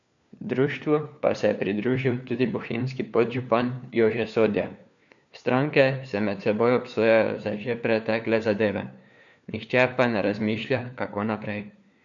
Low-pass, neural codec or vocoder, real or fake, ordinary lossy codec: 7.2 kHz; codec, 16 kHz, 4 kbps, FunCodec, trained on LibriTTS, 50 frames a second; fake; none